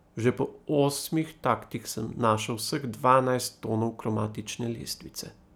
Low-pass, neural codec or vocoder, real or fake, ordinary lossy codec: none; none; real; none